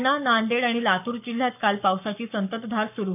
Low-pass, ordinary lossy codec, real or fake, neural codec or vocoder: 3.6 kHz; none; fake; codec, 44.1 kHz, 7.8 kbps, Pupu-Codec